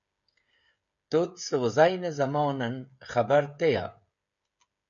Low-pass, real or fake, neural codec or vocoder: 7.2 kHz; fake; codec, 16 kHz, 16 kbps, FreqCodec, smaller model